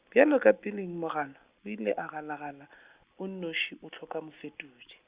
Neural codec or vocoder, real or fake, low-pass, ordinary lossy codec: none; real; 3.6 kHz; Opus, 64 kbps